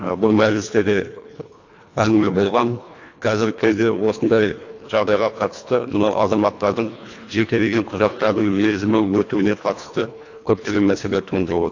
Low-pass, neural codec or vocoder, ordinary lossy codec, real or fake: 7.2 kHz; codec, 24 kHz, 1.5 kbps, HILCodec; AAC, 48 kbps; fake